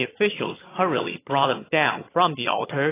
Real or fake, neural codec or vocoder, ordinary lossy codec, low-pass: fake; vocoder, 22.05 kHz, 80 mel bands, HiFi-GAN; AAC, 16 kbps; 3.6 kHz